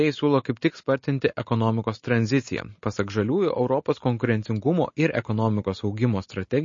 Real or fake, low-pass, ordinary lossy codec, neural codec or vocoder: real; 7.2 kHz; MP3, 32 kbps; none